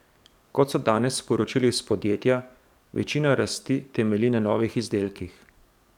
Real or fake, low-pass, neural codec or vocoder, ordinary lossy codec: fake; 19.8 kHz; codec, 44.1 kHz, 7.8 kbps, DAC; none